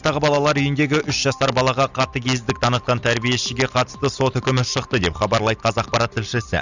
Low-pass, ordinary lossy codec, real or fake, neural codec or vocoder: 7.2 kHz; none; real; none